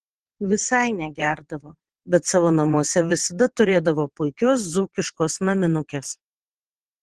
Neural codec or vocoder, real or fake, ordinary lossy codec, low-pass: vocoder, 22.05 kHz, 80 mel bands, WaveNeXt; fake; Opus, 16 kbps; 9.9 kHz